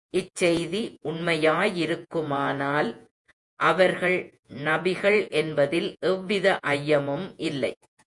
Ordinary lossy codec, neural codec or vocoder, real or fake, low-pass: MP3, 64 kbps; vocoder, 48 kHz, 128 mel bands, Vocos; fake; 10.8 kHz